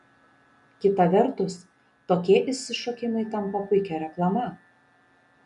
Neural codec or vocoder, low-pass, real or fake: none; 10.8 kHz; real